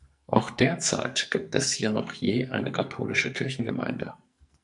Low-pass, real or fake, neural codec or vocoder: 10.8 kHz; fake; codec, 44.1 kHz, 2.6 kbps, SNAC